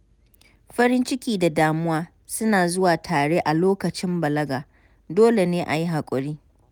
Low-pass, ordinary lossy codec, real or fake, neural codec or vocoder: none; none; real; none